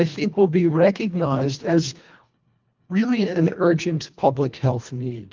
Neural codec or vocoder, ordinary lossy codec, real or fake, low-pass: codec, 24 kHz, 1.5 kbps, HILCodec; Opus, 32 kbps; fake; 7.2 kHz